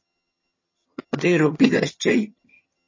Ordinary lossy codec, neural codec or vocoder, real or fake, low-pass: MP3, 32 kbps; vocoder, 22.05 kHz, 80 mel bands, HiFi-GAN; fake; 7.2 kHz